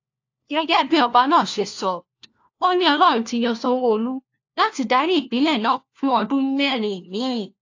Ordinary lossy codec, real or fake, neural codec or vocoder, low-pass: AAC, 48 kbps; fake; codec, 16 kHz, 1 kbps, FunCodec, trained on LibriTTS, 50 frames a second; 7.2 kHz